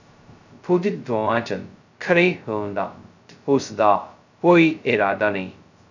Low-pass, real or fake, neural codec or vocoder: 7.2 kHz; fake; codec, 16 kHz, 0.2 kbps, FocalCodec